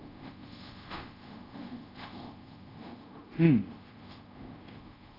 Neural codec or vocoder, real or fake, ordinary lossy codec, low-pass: codec, 24 kHz, 0.5 kbps, DualCodec; fake; AAC, 24 kbps; 5.4 kHz